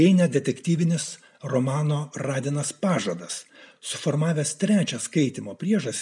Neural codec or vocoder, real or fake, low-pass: none; real; 10.8 kHz